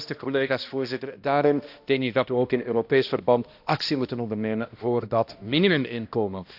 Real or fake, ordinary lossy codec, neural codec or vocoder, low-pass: fake; AAC, 48 kbps; codec, 16 kHz, 1 kbps, X-Codec, HuBERT features, trained on balanced general audio; 5.4 kHz